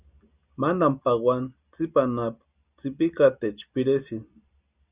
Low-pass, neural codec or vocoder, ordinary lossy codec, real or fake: 3.6 kHz; none; Opus, 64 kbps; real